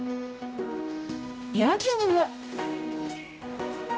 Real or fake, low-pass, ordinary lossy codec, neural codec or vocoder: fake; none; none; codec, 16 kHz, 0.5 kbps, X-Codec, HuBERT features, trained on general audio